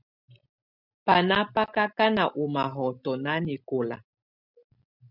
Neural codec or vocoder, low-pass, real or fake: none; 5.4 kHz; real